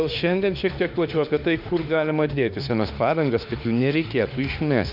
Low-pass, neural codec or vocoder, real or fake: 5.4 kHz; autoencoder, 48 kHz, 32 numbers a frame, DAC-VAE, trained on Japanese speech; fake